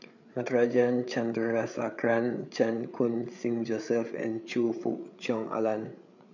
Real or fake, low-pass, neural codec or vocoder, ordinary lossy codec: fake; 7.2 kHz; codec, 16 kHz, 8 kbps, FreqCodec, larger model; none